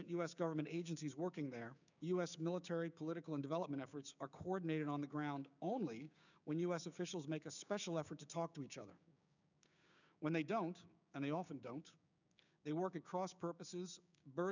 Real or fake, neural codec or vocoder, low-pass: fake; codec, 16 kHz, 6 kbps, DAC; 7.2 kHz